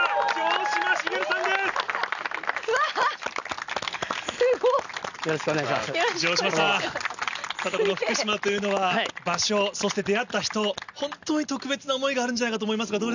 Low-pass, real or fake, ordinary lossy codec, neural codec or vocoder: 7.2 kHz; real; none; none